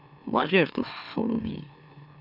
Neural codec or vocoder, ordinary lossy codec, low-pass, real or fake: autoencoder, 44.1 kHz, a latent of 192 numbers a frame, MeloTTS; none; 5.4 kHz; fake